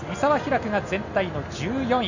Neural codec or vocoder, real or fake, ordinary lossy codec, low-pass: none; real; none; 7.2 kHz